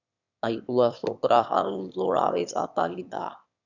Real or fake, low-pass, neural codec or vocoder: fake; 7.2 kHz; autoencoder, 22.05 kHz, a latent of 192 numbers a frame, VITS, trained on one speaker